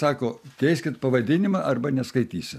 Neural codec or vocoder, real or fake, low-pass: none; real; 14.4 kHz